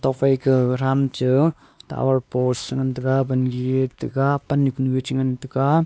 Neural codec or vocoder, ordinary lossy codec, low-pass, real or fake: codec, 16 kHz, 2 kbps, X-Codec, WavLM features, trained on Multilingual LibriSpeech; none; none; fake